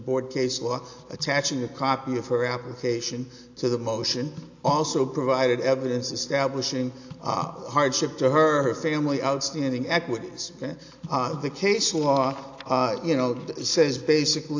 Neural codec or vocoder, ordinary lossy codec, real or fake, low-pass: none; AAC, 48 kbps; real; 7.2 kHz